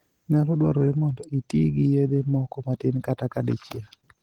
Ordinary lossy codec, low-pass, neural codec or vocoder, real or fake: Opus, 16 kbps; 19.8 kHz; none; real